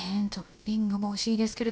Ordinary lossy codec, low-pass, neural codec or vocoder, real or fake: none; none; codec, 16 kHz, about 1 kbps, DyCAST, with the encoder's durations; fake